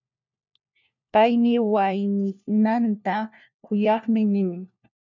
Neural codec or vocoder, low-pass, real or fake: codec, 16 kHz, 1 kbps, FunCodec, trained on LibriTTS, 50 frames a second; 7.2 kHz; fake